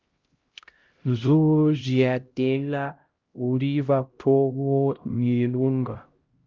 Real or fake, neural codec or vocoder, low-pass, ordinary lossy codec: fake; codec, 16 kHz, 0.5 kbps, X-Codec, HuBERT features, trained on LibriSpeech; 7.2 kHz; Opus, 32 kbps